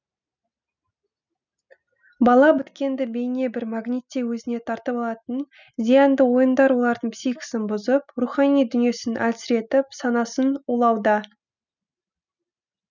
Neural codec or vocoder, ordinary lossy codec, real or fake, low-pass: none; none; real; 7.2 kHz